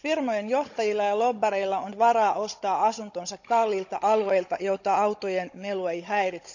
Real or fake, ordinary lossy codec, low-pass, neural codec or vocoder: fake; none; 7.2 kHz; codec, 16 kHz, 16 kbps, FunCodec, trained on Chinese and English, 50 frames a second